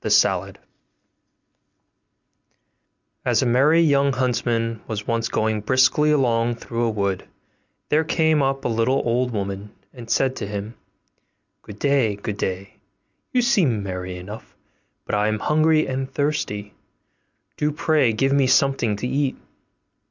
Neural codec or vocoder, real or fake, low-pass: none; real; 7.2 kHz